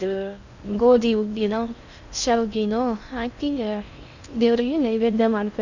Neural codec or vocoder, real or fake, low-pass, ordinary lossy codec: codec, 16 kHz in and 24 kHz out, 0.6 kbps, FocalCodec, streaming, 4096 codes; fake; 7.2 kHz; none